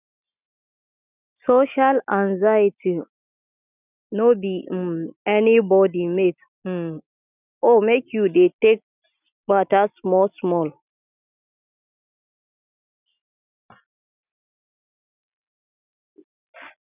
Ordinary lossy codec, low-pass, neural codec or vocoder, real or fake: none; 3.6 kHz; none; real